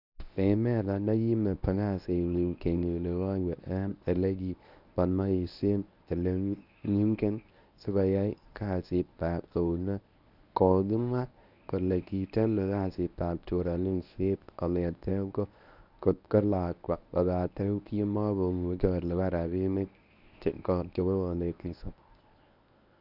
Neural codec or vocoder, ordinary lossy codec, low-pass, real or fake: codec, 24 kHz, 0.9 kbps, WavTokenizer, medium speech release version 1; none; 5.4 kHz; fake